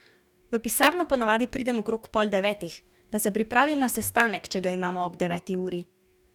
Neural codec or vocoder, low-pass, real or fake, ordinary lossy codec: codec, 44.1 kHz, 2.6 kbps, DAC; 19.8 kHz; fake; none